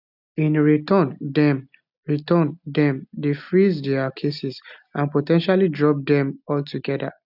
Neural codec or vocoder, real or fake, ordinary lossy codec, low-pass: none; real; none; 5.4 kHz